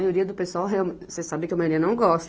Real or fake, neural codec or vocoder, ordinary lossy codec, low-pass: real; none; none; none